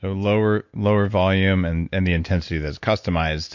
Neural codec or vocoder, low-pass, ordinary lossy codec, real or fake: none; 7.2 kHz; MP3, 48 kbps; real